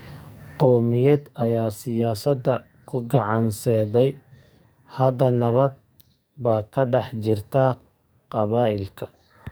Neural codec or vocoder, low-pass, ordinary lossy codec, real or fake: codec, 44.1 kHz, 2.6 kbps, SNAC; none; none; fake